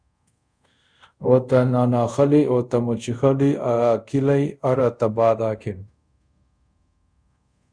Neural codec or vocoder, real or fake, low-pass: codec, 24 kHz, 0.5 kbps, DualCodec; fake; 9.9 kHz